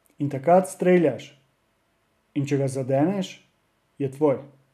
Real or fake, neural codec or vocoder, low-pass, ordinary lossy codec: real; none; 14.4 kHz; none